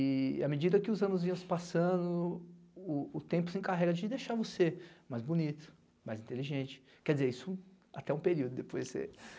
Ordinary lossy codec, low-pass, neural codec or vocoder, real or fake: none; none; none; real